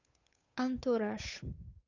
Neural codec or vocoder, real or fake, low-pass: codec, 16 kHz, 8 kbps, FunCodec, trained on Chinese and English, 25 frames a second; fake; 7.2 kHz